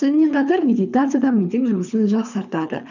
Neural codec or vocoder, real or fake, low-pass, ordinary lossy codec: codec, 24 kHz, 3 kbps, HILCodec; fake; 7.2 kHz; none